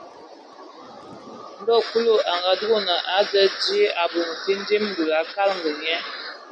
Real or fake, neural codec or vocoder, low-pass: real; none; 9.9 kHz